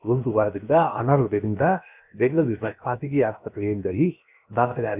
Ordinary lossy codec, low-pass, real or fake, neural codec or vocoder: none; 3.6 kHz; fake; codec, 16 kHz, 0.7 kbps, FocalCodec